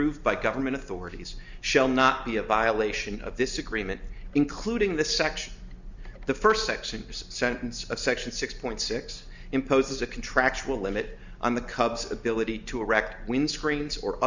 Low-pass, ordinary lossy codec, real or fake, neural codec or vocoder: 7.2 kHz; Opus, 64 kbps; real; none